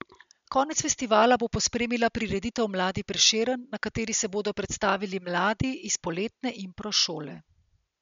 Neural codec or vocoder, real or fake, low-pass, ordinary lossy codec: none; real; 7.2 kHz; MP3, 64 kbps